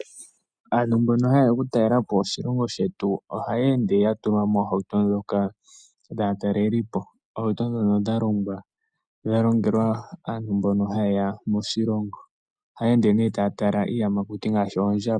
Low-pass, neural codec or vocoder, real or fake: 9.9 kHz; none; real